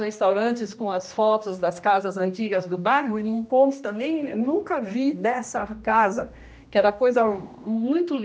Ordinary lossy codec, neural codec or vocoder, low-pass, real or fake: none; codec, 16 kHz, 1 kbps, X-Codec, HuBERT features, trained on general audio; none; fake